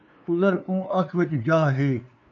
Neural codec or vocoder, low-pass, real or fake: codec, 16 kHz, 2 kbps, FunCodec, trained on LibriTTS, 25 frames a second; 7.2 kHz; fake